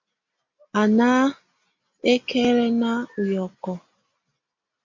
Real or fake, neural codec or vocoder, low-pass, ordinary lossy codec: real; none; 7.2 kHz; MP3, 64 kbps